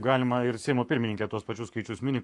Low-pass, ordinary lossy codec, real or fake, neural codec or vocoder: 10.8 kHz; AAC, 64 kbps; fake; codec, 44.1 kHz, 7.8 kbps, Pupu-Codec